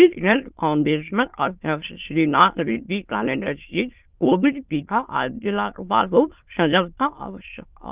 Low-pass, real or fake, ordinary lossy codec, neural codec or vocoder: 3.6 kHz; fake; Opus, 24 kbps; autoencoder, 22.05 kHz, a latent of 192 numbers a frame, VITS, trained on many speakers